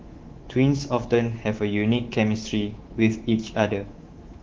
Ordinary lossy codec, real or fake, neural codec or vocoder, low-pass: Opus, 16 kbps; fake; codec, 24 kHz, 3.1 kbps, DualCodec; 7.2 kHz